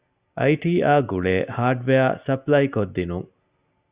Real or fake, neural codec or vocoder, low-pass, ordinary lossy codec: real; none; 3.6 kHz; Opus, 64 kbps